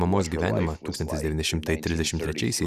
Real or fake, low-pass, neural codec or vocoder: real; 14.4 kHz; none